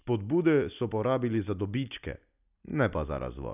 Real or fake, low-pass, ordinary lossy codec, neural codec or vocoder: real; 3.6 kHz; none; none